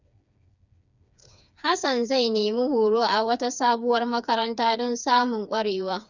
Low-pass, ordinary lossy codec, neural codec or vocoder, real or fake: 7.2 kHz; none; codec, 16 kHz, 4 kbps, FreqCodec, smaller model; fake